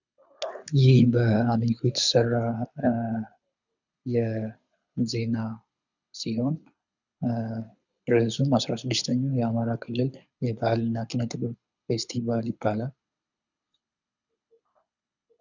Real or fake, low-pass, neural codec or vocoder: fake; 7.2 kHz; codec, 24 kHz, 6 kbps, HILCodec